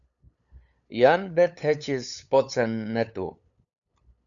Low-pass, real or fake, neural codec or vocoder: 7.2 kHz; fake; codec, 16 kHz, 8 kbps, FunCodec, trained on LibriTTS, 25 frames a second